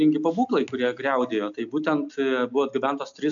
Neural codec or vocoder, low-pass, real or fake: none; 7.2 kHz; real